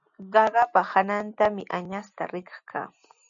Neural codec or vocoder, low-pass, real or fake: none; 7.2 kHz; real